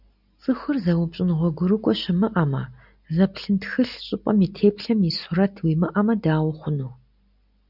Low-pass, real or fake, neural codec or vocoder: 5.4 kHz; real; none